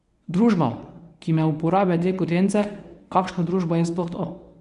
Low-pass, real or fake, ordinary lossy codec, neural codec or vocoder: 10.8 kHz; fake; none; codec, 24 kHz, 0.9 kbps, WavTokenizer, medium speech release version 1